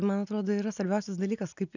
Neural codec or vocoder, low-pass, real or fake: none; 7.2 kHz; real